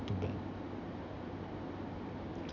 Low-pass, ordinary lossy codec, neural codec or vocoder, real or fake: 7.2 kHz; none; none; real